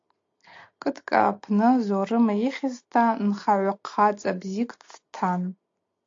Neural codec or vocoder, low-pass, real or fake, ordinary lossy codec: none; 7.2 kHz; real; AAC, 48 kbps